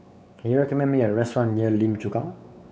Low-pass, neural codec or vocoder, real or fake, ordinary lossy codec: none; codec, 16 kHz, 4 kbps, X-Codec, WavLM features, trained on Multilingual LibriSpeech; fake; none